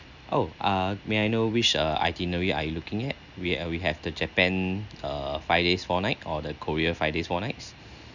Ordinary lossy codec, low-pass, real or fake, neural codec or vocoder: none; 7.2 kHz; real; none